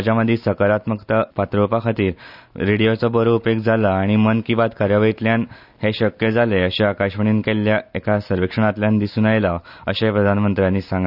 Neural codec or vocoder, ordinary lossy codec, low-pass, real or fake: none; none; 5.4 kHz; real